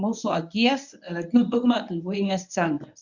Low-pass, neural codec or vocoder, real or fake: 7.2 kHz; codec, 24 kHz, 0.9 kbps, WavTokenizer, medium speech release version 1; fake